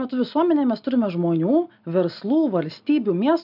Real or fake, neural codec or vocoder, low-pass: real; none; 5.4 kHz